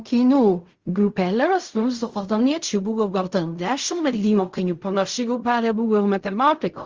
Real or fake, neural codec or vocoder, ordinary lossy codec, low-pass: fake; codec, 16 kHz in and 24 kHz out, 0.4 kbps, LongCat-Audio-Codec, fine tuned four codebook decoder; Opus, 32 kbps; 7.2 kHz